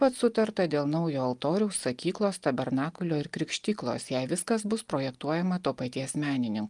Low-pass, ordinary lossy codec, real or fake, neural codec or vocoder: 10.8 kHz; Opus, 32 kbps; real; none